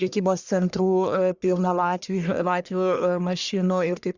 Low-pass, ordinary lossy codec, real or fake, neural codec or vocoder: 7.2 kHz; Opus, 64 kbps; fake; codec, 44.1 kHz, 1.7 kbps, Pupu-Codec